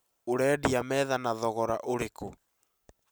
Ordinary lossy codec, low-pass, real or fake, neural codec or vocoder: none; none; real; none